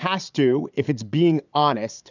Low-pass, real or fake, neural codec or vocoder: 7.2 kHz; fake; vocoder, 22.05 kHz, 80 mel bands, Vocos